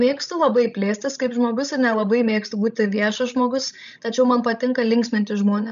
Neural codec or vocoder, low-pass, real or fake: codec, 16 kHz, 16 kbps, FreqCodec, larger model; 7.2 kHz; fake